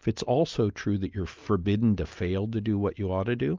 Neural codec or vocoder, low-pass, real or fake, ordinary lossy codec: none; 7.2 kHz; real; Opus, 24 kbps